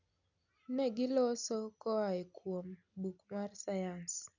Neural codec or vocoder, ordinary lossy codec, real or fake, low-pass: none; none; real; 7.2 kHz